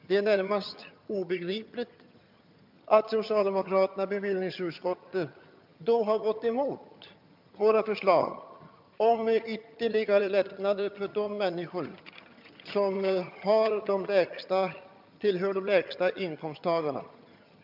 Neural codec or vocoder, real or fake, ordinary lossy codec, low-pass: vocoder, 22.05 kHz, 80 mel bands, HiFi-GAN; fake; MP3, 48 kbps; 5.4 kHz